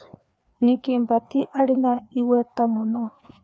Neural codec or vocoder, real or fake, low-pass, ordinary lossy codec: codec, 16 kHz, 2 kbps, FreqCodec, larger model; fake; none; none